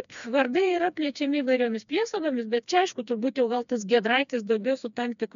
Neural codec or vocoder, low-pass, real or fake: codec, 16 kHz, 2 kbps, FreqCodec, smaller model; 7.2 kHz; fake